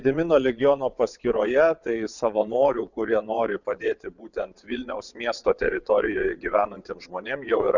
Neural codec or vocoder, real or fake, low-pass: vocoder, 22.05 kHz, 80 mel bands, WaveNeXt; fake; 7.2 kHz